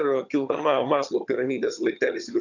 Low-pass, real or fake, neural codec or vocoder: 7.2 kHz; fake; vocoder, 22.05 kHz, 80 mel bands, HiFi-GAN